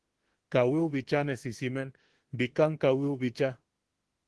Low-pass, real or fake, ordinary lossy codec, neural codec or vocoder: 10.8 kHz; fake; Opus, 16 kbps; autoencoder, 48 kHz, 32 numbers a frame, DAC-VAE, trained on Japanese speech